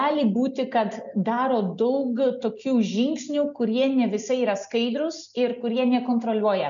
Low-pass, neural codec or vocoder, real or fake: 7.2 kHz; none; real